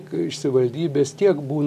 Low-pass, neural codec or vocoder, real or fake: 14.4 kHz; none; real